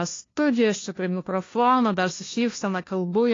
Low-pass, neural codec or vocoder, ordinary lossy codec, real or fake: 7.2 kHz; codec, 16 kHz, 1 kbps, FunCodec, trained on LibriTTS, 50 frames a second; AAC, 32 kbps; fake